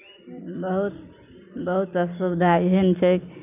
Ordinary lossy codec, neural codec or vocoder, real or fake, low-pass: none; none; real; 3.6 kHz